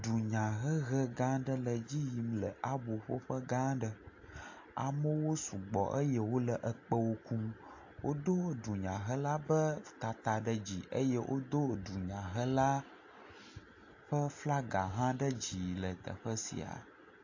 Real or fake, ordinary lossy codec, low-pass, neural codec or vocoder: real; AAC, 48 kbps; 7.2 kHz; none